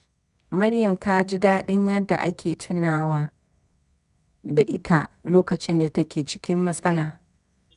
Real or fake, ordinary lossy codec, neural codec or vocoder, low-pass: fake; none; codec, 24 kHz, 0.9 kbps, WavTokenizer, medium music audio release; 10.8 kHz